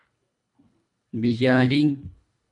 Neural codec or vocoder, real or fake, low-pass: codec, 24 kHz, 1.5 kbps, HILCodec; fake; 10.8 kHz